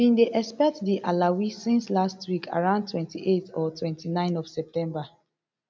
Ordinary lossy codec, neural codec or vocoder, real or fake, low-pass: none; none; real; none